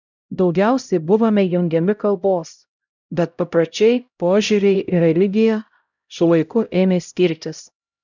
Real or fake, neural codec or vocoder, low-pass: fake; codec, 16 kHz, 0.5 kbps, X-Codec, HuBERT features, trained on LibriSpeech; 7.2 kHz